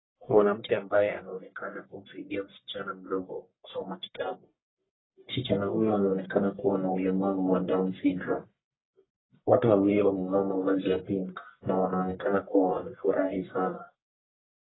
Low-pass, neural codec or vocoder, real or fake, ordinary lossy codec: 7.2 kHz; codec, 44.1 kHz, 1.7 kbps, Pupu-Codec; fake; AAC, 16 kbps